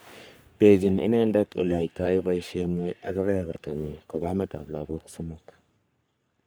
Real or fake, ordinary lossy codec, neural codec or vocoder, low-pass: fake; none; codec, 44.1 kHz, 3.4 kbps, Pupu-Codec; none